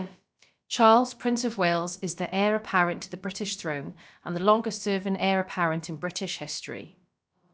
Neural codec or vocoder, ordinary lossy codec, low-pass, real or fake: codec, 16 kHz, about 1 kbps, DyCAST, with the encoder's durations; none; none; fake